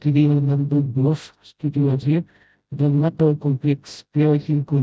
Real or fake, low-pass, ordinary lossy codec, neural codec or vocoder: fake; none; none; codec, 16 kHz, 0.5 kbps, FreqCodec, smaller model